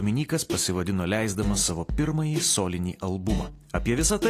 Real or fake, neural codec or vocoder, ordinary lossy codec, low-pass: real; none; AAC, 48 kbps; 14.4 kHz